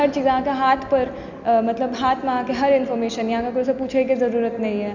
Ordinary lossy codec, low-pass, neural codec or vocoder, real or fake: Opus, 64 kbps; 7.2 kHz; none; real